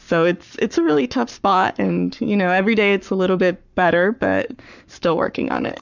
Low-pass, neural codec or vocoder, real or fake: 7.2 kHz; codec, 44.1 kHz, 7.8 kbps, Pupu-Codec; fake